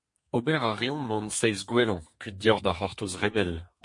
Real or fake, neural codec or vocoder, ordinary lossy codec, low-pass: fake; codec, 32 kHz, 1.9 kbps, SNAC; MP3, 48 kbps; 10.8 kHz